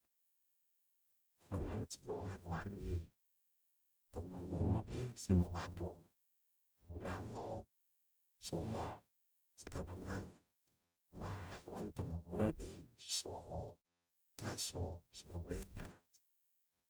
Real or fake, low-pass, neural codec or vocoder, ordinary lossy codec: fake; none; codec, 44.1 kHz, 0.9 kbps, DAC; none